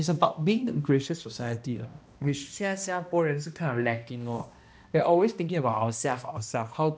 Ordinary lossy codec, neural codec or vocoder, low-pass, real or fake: none; codec, 16 kHz, 1 kbps, X-Codec, HuBERT features, trained on balanced general audio; none; fake